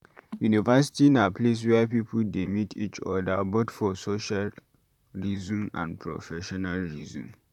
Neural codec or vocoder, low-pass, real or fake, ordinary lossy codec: vocoder, 44.1 kHz, 128 mel bands, Pupu-Vocoder; 19.8 kHz; fake; none